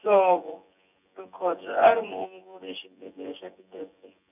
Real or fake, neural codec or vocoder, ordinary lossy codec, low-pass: fake; vocoder, 24 kHz, 100 mel bands, Vocos; none; 3.6 kHz